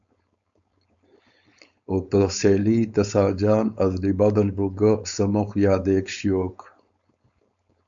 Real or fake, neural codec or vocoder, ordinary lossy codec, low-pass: fake; codec, 16 kHz, 4.8 kbps, FACodec; MP3, 64 kbps; 7.2 kHz